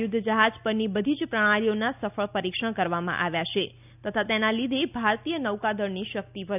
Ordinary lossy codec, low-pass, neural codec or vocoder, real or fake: none; 3.6 kHz; none; real